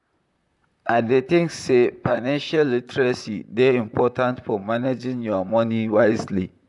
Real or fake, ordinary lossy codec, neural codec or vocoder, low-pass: fake; none; vocoder, 44.1 kHz, 128 mel bands, Pupu-Vocoder; 10.8 kHz